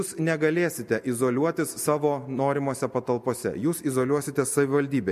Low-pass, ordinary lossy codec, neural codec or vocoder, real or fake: 14.4 kHz; MP3, 64 kbps; none; real